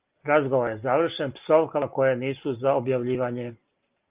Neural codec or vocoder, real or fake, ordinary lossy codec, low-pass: none; real; Opus, 32 kbps; 3.6 kHz